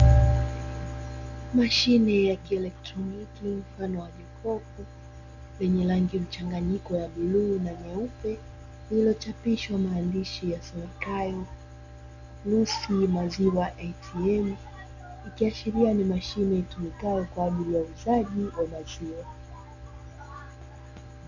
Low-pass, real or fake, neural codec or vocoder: 7.2 kHz; real; none